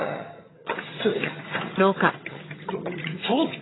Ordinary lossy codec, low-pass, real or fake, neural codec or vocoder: AAC, 16 kbps; 7.2 kHz; fake; vocoder, 22.05 kHz, 80 mel bands, HiFi-GAN